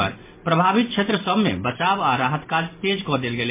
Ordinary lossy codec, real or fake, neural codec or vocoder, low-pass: MP3, 24 kbps; real; none; 3.6 kHz